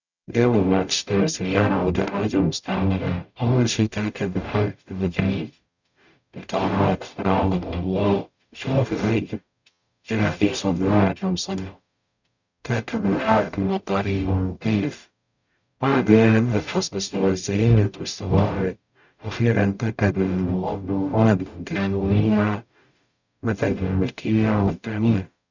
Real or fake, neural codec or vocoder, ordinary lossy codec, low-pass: fake; codec, 44.1 kHz, 0.9 kbps, DAC; none; 7.2 kHz